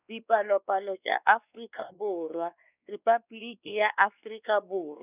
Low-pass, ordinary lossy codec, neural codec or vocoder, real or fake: 3.6 kHz; none; codec, 16 kHz, 2 kbps, X-Codec, WavLM features, trained on Multilingual LibriSpeech; fake